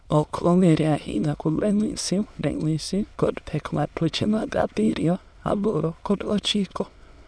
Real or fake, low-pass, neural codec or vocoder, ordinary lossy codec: fake; none; autoencoder, 22.05 kHz, a latent of 192 numbers a frame, VITS, trained on many speakers; none